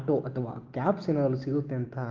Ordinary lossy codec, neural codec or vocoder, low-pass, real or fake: Opus, 16 kbps; none; 7.2 kHz; real